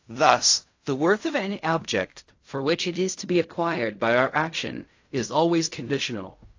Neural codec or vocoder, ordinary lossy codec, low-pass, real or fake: codec, 16 kHz in and 24 kHz out, 0.4 kbps, LongCat-Audio-Codec, fine tuned four codebook decoder; AAC, 48 kbps; 7.2 kHz; fake